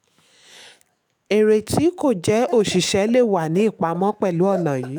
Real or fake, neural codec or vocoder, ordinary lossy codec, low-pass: fake; autoencoder, 48 kHz, 128 numbers a frame, DAC-VAE, trained on Japanese speech; none; none